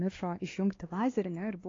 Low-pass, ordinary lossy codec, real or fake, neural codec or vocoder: 7.2 kHz; AAC, 32 kbps; fake; codec, 16 kHz, 2 kbps, X-Codec, WavLM features, trained on Multilingual LibriSpeech